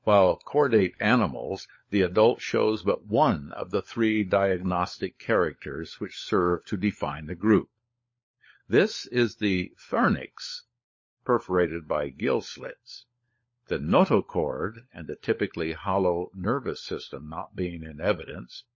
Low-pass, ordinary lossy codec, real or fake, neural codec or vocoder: 7.2 kHz; MP3, 32 kbps; fake; codec, 16 kHz, 16 kbps, FunCodec, trained on LibriTTS, 50 frames a second